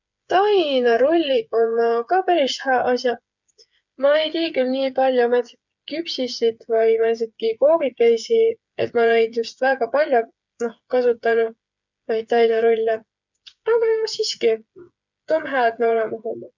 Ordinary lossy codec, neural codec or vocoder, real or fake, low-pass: none; codec, 16 kHz, 8 kbps, FreqCodec, smaller model; fake; 7.2 kHz